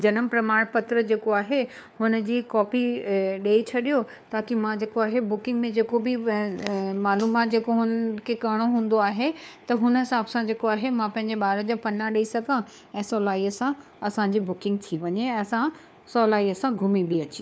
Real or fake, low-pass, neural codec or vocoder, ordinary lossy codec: fake; none; codec, 16 kHz, 4 kbps, FunCodec, trained on LibriTTS, 50 frames a second; none